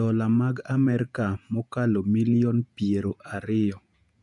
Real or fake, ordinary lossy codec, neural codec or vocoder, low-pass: fake; none; vocoder, 48 kHz, 128 mel bands, Vocos; 10.8 kHz